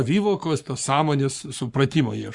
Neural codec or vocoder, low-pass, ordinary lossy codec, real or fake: codec, 44.1 kHz, 7.8 kbps, Pupu-Codec; 10.8 kHz; Opus, 64 kbps; fake